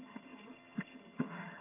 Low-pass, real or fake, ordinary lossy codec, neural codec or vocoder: 3.6 kHz; fake; MP3, 24 kbps; vocoder, 22.05 kHz, 80 mel bands, HiFi-GAN